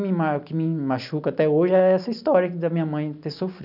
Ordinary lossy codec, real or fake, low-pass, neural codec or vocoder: none; real; 5.4 kHz; none